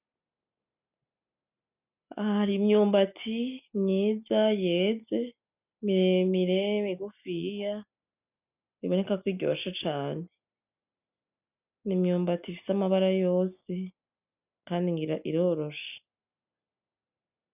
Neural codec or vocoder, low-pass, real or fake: none; 3.6 kHz; real